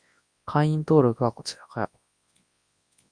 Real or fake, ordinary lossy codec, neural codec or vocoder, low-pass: fake; Opus, 64 kbps; codec, 24 kHz, 0.9 kbps, WavTokenizer, large speech release; 9.9 kHz